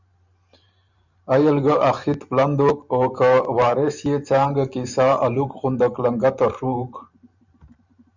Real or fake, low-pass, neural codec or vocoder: fake; 7.2 kHz; vocoder, 44.1 kHz, 128 mel bands every 512 samples, BigVGAN v2